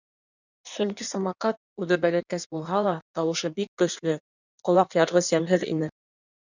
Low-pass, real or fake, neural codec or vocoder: 7.2 kHz; fake; codec, 16 kHz in and 24 kHz out, 1.1 kbps, FireRedTTS-2 codec